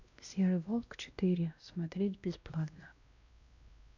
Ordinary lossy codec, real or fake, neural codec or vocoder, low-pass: none; fake; codec, 16 kHz, 1 kbps, X-Codec, WavLM features, trained on Multilingual LibriSpeech; 7.2 kHz